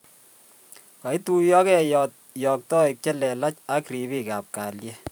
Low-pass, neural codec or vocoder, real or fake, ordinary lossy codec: none; vocoder, 44.1 kHz, 128 mel bands every 512 samples, BigVGAN v2; fake; none